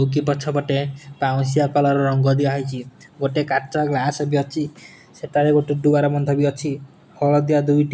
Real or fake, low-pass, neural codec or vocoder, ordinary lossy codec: real; none; none; none